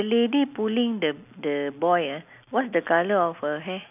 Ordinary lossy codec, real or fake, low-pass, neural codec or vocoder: none; real; 3.6 kHz; none